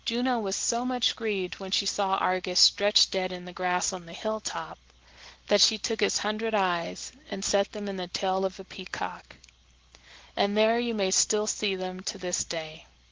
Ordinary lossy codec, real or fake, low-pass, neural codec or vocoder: Opus, 16 kbps; real; 7.2 kHz; none